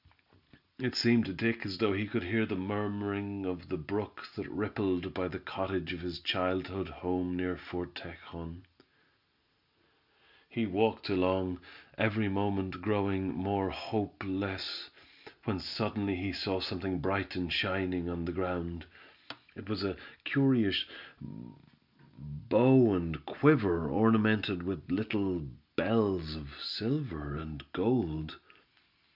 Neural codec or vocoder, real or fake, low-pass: none; real; 5.4 kHz